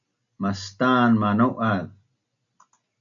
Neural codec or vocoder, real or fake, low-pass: none; real; 7.2 kHz